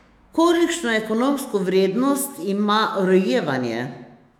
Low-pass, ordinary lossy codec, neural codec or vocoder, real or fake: 19.8 kHz; none; autoencoder, 48 kHz, 128 numbers a frame, DAC-VAE, trained on Japanese speech; fake